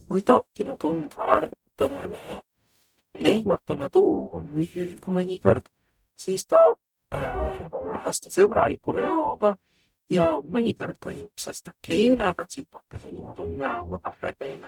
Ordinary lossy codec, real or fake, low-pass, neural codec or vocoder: none; fake; 19.8 kHz; codec, 44.1 kHz, 0.9 kbps, DAC